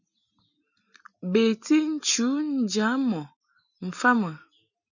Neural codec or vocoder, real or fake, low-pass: none; real; 7.2 kHz